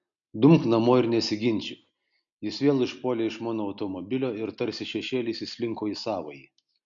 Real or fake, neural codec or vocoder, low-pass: real; none; 7.2 kHz